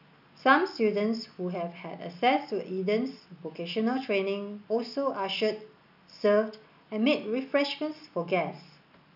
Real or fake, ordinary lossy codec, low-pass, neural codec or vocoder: real; none; 5.4 kHz; none